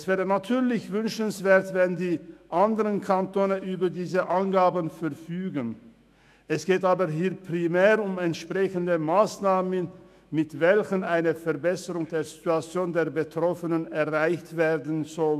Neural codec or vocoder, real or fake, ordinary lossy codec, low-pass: autoencoder, 48 kHz, 128 numbers a frame, DAC-VAE, trained on Japanese speech; fake; none; 14.4 kHz